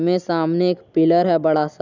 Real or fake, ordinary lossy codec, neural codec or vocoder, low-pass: real; none; none; 7.2 kHz